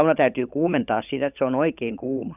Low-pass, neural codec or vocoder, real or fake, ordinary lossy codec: 3.6 kHz; codec, 16 kHz, 16 kbps, FunCodec, trained on LibriTTS, 50 frames a second; fake; none